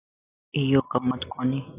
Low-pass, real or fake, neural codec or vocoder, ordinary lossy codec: 3.6 kHz; real; none; AAC, 24 kbps